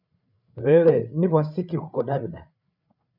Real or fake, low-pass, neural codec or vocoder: fake; 5.4 kHz; codec, 16 kHz, 8 kbps, FreqCodec, larger model